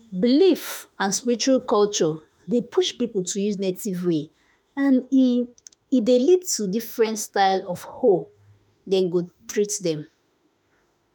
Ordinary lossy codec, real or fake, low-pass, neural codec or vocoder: none; fake; none; autoencoder, 48 kHz, 32 numbers a frame, DAC-VAE, trained on Japanese speech